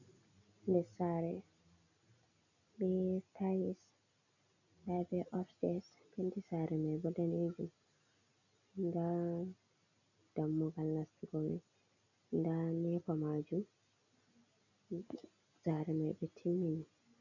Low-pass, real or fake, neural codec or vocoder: 7.2 kHz; real; none